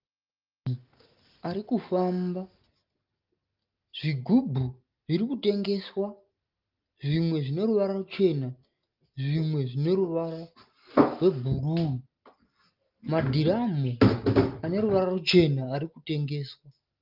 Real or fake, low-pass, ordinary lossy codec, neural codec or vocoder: real; 5.4 kHz; Opus, 32 kbps; none